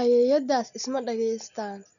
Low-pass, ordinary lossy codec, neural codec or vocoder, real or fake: 7.2 kHz; MP3, 96 kbps; none; real